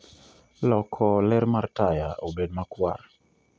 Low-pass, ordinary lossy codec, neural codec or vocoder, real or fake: none; none; none; real